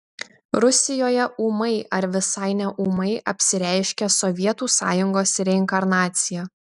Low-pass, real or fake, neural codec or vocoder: 10.8 kHz; real; none